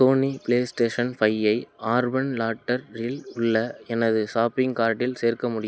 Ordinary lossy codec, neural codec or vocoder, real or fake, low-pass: none; none; real; none